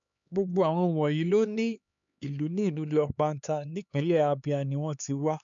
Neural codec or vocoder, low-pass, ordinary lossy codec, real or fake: codec, 16 kHz, 4 kbps, X-Codec, HuBERT features, trained on LibriSpeech; 7.2 kHz; MP3, 96 kbps; fake